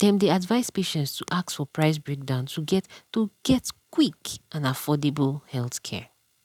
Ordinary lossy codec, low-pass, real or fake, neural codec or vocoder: none; 19.8 kHz; real; none